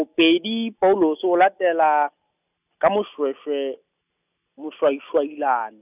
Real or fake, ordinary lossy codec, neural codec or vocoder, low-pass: real; none; none; 3.6 kHz